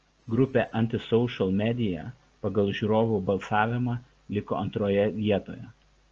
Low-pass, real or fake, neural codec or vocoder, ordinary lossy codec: 7.2 kHz; real; none; Opus, 24 kbps